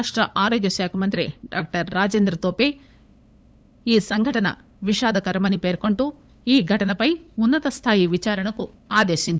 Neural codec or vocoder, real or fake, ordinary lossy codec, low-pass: codec, 16 kHz, 8 kbps, FunCodec, trained on LibriTTS, 25 frames a second; fake; none; none